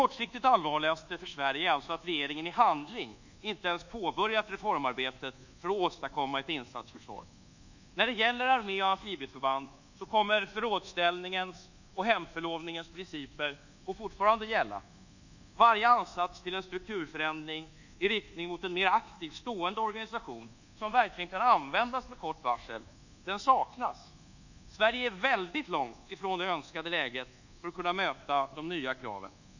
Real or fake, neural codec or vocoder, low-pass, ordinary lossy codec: fake; codec, 24 kHz, 1.2 kbps, DualCodec; 7.2 kHz; none